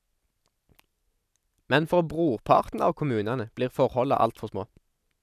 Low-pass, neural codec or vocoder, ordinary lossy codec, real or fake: 14.4 kHz; none; none; real